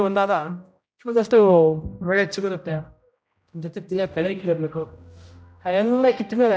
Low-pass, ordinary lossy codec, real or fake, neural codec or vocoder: none; none; fake; codec, 16 kHz, 0.5 kbps, X-Codec, HuBERT features, trained on general audio